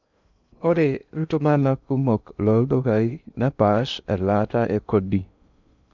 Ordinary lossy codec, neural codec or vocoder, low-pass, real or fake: none; codec, 16 kHz in and 24 kHz out, 0.6 kbps, FocalCodec, streaming, 2048 codes; 7.2 kHz; fake